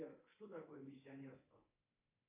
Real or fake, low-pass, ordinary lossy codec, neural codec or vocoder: fake; 3.6 kHz; MP3, 24 kbps; codec, 24 kHz, 3.1 kbps, DualCodec